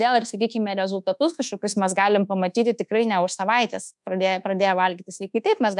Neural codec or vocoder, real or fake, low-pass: codec, 24 kHz, 1.2 kbps, DualCodec; fake; 10.8 kHz